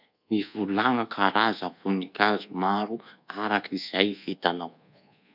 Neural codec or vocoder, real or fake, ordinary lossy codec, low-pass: codec, 24 kHz, 1.2 kbps, DualCodec; fake; none; 5.4 kHz